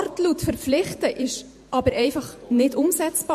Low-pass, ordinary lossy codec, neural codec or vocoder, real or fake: 14.4 kHz; MP3, 64 kbps; vocoder, 48 kHz, 128 mel bands, Vocos; fake